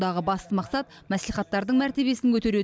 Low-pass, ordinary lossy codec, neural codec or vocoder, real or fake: none; none; none; real